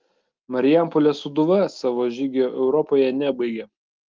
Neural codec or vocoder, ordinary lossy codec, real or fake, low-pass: none; Opus, 32 kbps; real; 7.2 kHz